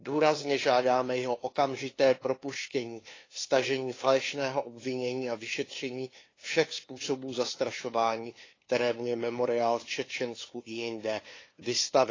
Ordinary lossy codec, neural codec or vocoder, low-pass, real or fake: AAC, 32 kbps; codec, 16 kHz, 2 kbps, FunCodec, trained on LibriTTS, 25 frames a second; 7.2 kHz; fake